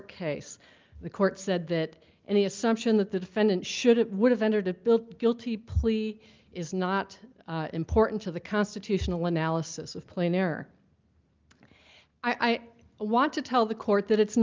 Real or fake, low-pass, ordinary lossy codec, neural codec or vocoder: real; 7.2 kHz; Opus, 24 kbps; none